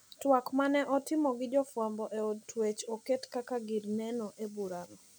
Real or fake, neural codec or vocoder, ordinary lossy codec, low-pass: real; none; none; none